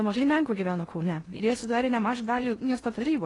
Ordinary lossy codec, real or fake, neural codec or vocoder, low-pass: AAC, 32 kbps; fake; codec, 16 kHz in and 24 kHz out, 0.6 kbps, FocalCodec, streaming, 4096 codes; 10.8 kHz